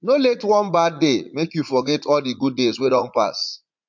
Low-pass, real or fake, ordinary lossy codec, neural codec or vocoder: 7.2 kHz; fake; MP3, 48 kbps; vocoder, 44.1 kHz, 80 mel bands, Vocos